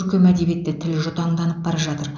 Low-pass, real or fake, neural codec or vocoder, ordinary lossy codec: 7.2 kHz; real; none; none